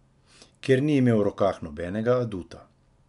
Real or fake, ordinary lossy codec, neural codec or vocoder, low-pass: real; MP3, 96 kbps; none; 10.8 kHz